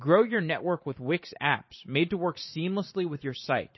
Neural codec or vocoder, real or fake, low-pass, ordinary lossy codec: vocoder, 44.1 kHz, 128 mel bands every 512 samples, BigVGAN v2; fake; 7.2 kHz; MP3, 24 kbps